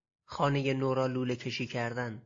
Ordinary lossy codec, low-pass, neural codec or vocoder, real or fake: AAC, 48 kbps; 7.2 kHz; none; real